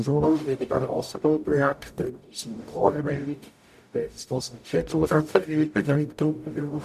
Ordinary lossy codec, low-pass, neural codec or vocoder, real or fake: none; 14.4 kHz; codec, 44.1 kHz, 0.9 kbps, DAC; fake